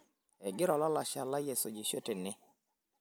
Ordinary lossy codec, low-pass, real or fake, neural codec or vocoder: none; none; real; none